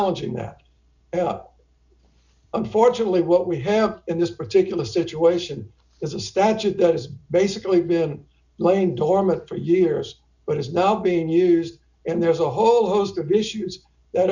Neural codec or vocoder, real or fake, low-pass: none; real; 7.2 kHz